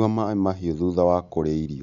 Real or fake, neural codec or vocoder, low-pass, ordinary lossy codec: real; none; 7.2 kHz; none